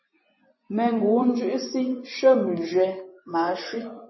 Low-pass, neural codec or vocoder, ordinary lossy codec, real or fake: 7.2 kHz; vocoder, 24 kHz, 100 mel bands, Vocos; MP3, 24 kbps; fake